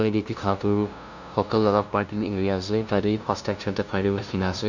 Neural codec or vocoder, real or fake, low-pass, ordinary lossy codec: codec, 16 kHz, 0.5 kbps, FunCodec, trained on LibriTTS, 25 frames a second; fake; 7.2 kHz; none